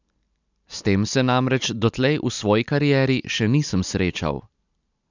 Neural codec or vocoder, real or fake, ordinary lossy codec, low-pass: none; real; none; 7.2 kHz